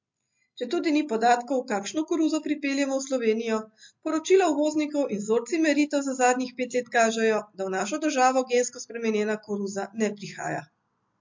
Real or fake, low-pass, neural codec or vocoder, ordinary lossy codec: real; 7.2 kHz; none; MP3, 48 kbps